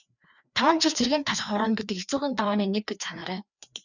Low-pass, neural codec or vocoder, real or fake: 7.2 kHz; codec, 16 kHz, 2 kbps, FreqCodec, larger model; fake